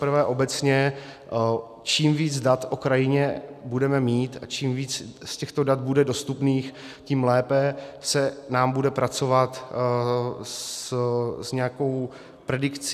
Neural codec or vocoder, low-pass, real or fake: none; 14.4 kHz; real